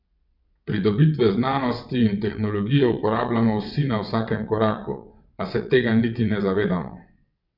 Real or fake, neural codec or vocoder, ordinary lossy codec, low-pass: fake; vocoder, 22.05 kHz, 80 mel bands, WaveNeXt; none; 5.4 kHz